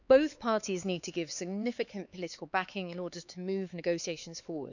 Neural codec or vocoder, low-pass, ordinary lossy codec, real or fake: codec, 16 kHz, 4 kbps, X-Codec, HuBERT features, trained on LibriSpeech; 7.2 kHz; none; fake